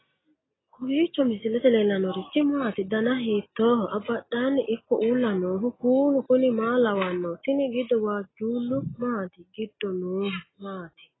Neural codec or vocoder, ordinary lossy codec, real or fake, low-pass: none; AAC, 16 kbps; real; 7.2 kHz